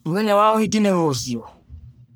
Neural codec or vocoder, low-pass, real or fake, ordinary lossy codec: codec, 44.1 kHz, 1.7 kbps, Pupu-Codec; none; fake; none